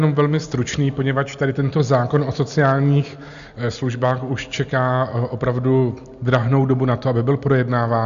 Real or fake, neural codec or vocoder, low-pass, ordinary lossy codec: real; none; 7.2 kHz; Opus, 64 kbps